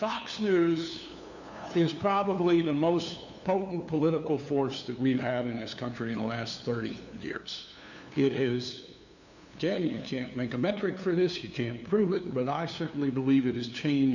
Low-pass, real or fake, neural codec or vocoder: 7.2 kHz; fake; codec, 16 kHz, 2 kbps, FunCodec, trained on LibriTTS, 25 frames a second